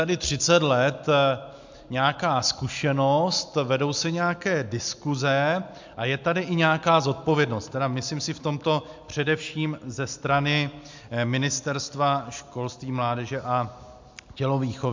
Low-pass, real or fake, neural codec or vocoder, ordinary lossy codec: 7.2 kHz; real; none; MP3, 64 kbps